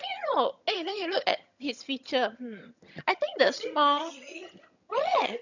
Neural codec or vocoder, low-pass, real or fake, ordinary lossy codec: vocoder, 22.05 kHz, 80 mel bands, HiFi-GAN; 7.2 kHz; fake; none